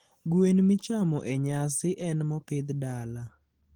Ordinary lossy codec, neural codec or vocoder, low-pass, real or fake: Opus, 16 kbps; none; 19.8 kHz; real